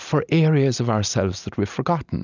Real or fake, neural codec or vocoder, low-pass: real; none; 7.2 kHz